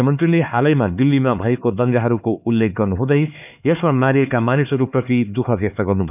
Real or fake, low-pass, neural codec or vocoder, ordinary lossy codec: fake; 3.6 kHz; codec, 16 kHz, 4 kbps, X-Codec, HuBERT features, trained on LibriSpeech; none